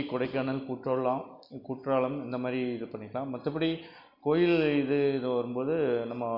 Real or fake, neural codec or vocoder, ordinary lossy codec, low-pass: real; none; none; 5.4 kHz